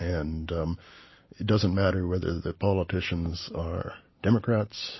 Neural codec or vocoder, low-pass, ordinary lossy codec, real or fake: none; 7.2 kHz; MP3, 24 kbps; real